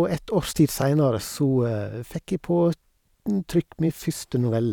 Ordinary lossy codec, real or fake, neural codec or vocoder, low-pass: none; fake; vocoder, 44.1 kHz, 128 mel bands every 512 samples, BigVGAN v2; 19.8 kHz